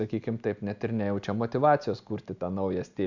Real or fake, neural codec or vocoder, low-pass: real; none; 7.2 kHz